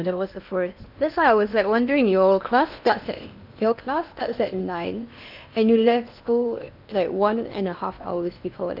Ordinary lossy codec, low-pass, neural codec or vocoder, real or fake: none; 5.4 kHz; codec, 16 kHz in and 24 kHz out, 0.8 kbps, FocalCodec, streaming, 65536 codes; fake